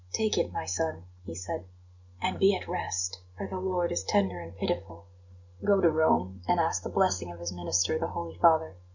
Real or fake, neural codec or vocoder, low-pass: real; none; 7.2 kHz